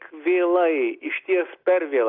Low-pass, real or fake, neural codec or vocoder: 5.4 kHz; real; none